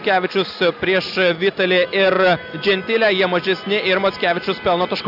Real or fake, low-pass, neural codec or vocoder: real; 5.4 kHz; none